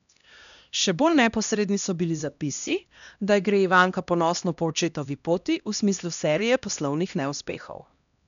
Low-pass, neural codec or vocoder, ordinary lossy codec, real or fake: 7.2 kHz; codec, 16 kHz, 1 kbps, X-Codec, HuBERT features, trained on LibriSpeech; none; fake